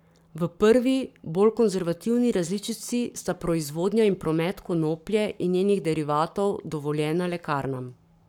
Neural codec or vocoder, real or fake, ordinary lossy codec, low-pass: codec, 44.1 kHz, 7.8 kbps, Pupu-Codec; fake; none; 19.8 kHz